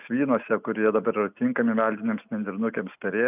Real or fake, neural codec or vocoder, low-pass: real; none; 3.6 kHz